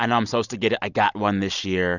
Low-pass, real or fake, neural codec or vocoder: 7.2 kHz; real; none